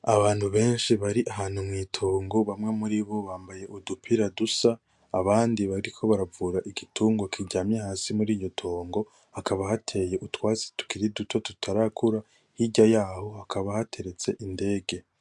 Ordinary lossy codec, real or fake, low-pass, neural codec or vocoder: MP3, 64 kbps; real; 10.8 kHz; none